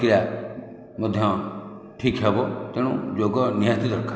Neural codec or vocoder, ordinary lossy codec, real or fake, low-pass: none; none; real; none